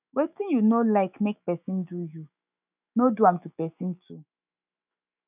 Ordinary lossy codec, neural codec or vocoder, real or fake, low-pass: none; autoencoder, 48 kHz, 128 numbers a frame, DAC-VAE, trained on Japanese speech; fake; 3.6 kHz